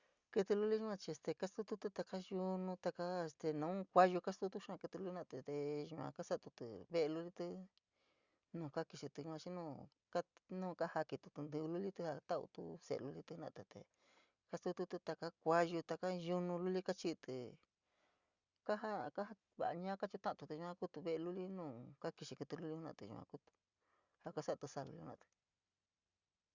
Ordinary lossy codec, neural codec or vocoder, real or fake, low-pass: Opus, 64 kbps; none; real; 7.2 kHz